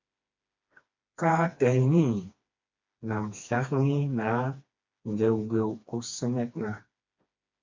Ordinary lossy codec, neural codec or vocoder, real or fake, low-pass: MP3, 48 kbps; codec, 16 kHz, 2 kbps, FreqCodec, smaller model; fake; 7.2 kHz